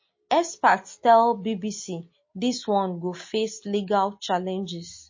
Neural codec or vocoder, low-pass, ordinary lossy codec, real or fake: none; 7.2 kHz; MP3, 32 kbps; real